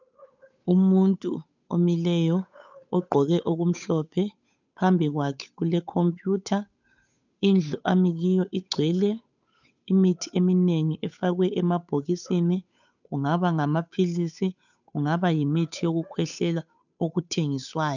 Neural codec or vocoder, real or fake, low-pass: codec, 16 kHz, 8 kbps, FunCodec, trained on Chinese and English, 25 frames a second; fake; 7.2 kHz